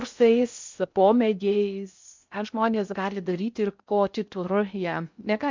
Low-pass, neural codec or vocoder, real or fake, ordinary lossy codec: 7.2 kHz; codec, 16 kHz in and 24 kHz out, 0.6 kbps, FocalCodec, streaming, 4096 codes; fake; MP3, 64 kbps